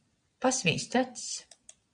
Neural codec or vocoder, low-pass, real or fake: vocoder, 22.05 kHz, 80 mel bands, Vocos; 9.9 kHz; fake